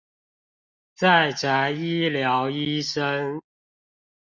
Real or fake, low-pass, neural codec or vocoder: real; 7.2 kHz; none